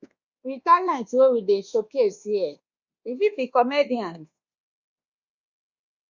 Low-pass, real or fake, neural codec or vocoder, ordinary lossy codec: 7.2 kHz; fake; codec, 24 kHz, 1.2 kbps, DualCodec; Opus, 64 kbps